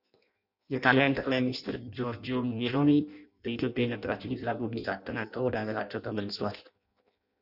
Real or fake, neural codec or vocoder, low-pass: fake; codec, 16 kHz in and 24 kHz out, 0.6 kbps, FireRedTTS-2 codec; 5.4 kHz